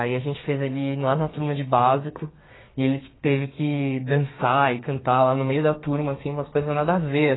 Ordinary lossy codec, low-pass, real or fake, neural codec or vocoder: AAC, 16 kbps; 7.2 kHz; fake; codec, 32 kHz, 1.9 kbps, SNAC